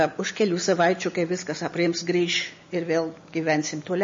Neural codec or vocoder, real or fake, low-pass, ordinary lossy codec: none; real; 7.2 kHz; MP3, 32 kbps